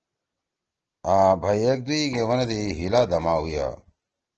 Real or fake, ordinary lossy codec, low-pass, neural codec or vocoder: real; Opus, 16 kbps; 7.2 kHz; none